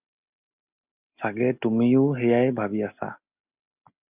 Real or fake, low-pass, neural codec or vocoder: real; 3.6 kHz; none